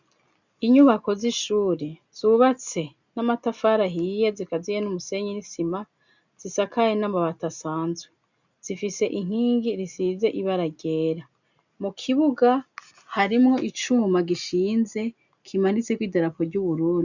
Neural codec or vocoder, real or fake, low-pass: none; real; 7.2 kHz